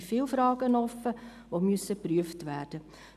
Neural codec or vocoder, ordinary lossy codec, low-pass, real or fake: none; none; 14.4 kHz; real